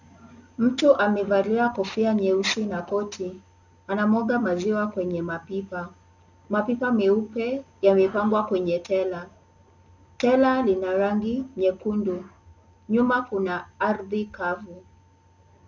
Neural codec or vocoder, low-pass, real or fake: none; 7.2 kHz; real